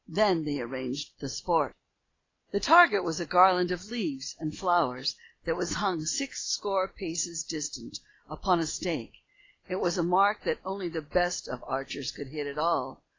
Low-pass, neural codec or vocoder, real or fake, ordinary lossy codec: 7.2 kHz; none; real; AAC, 32 kbps